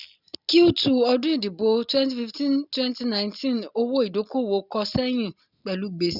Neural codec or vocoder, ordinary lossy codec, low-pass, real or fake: none; none; 5.4 kHz; real